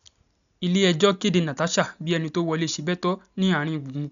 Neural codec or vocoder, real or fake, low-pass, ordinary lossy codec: none; real; 7.2 kHz; none